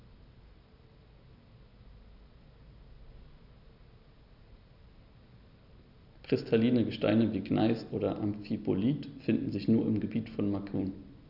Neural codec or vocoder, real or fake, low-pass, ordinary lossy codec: none; real; 5.4 kHz; none